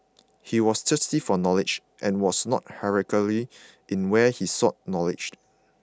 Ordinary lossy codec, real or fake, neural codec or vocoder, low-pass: none; real; none; none